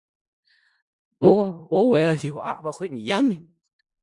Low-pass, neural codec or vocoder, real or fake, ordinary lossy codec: 10.8 kHz; codec, 16 kHz in and 24 kHz out, 0.4 kbps, LongCat-Audio-Codec, four codebook decoder; fake; Opus, 64 kbps